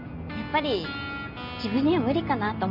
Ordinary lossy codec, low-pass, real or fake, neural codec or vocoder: none; 5.4 kHz; real; none